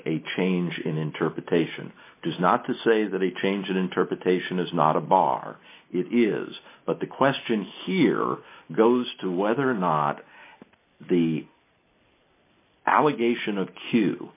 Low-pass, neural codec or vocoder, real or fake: 3.6 kHz; none; real